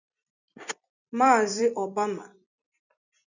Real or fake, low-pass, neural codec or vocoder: fake; 7.2 kHz; vocoder, 44.1 kHz, 128 mel bands every 256 samples, BigVGAN v2